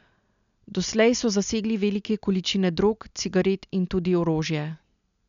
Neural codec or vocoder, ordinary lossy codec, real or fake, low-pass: none; none; real; 7.2 kHz